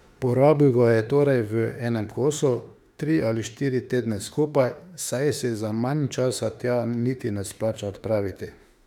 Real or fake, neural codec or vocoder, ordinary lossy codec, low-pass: fake; autoencoder, 48 kHz, 32 numbers a frame, DAC-VAE, trained on Japanese speech; none; 19.8 kHz